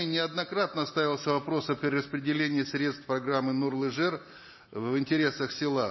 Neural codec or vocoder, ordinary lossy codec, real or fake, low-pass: none; MP3, 24 kbps; real; 7.2 kHz